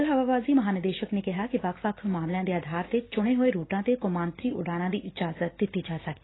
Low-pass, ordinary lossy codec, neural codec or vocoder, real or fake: 7.2 kHz; AAC, 16 kbps; none; real